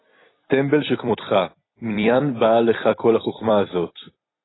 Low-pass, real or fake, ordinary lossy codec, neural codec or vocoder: 7.2 kHz; fake; AAC, 16 kbps; vocoder, 44.1 kHz, 128 mel bands every 256 samples, BigVGAN v2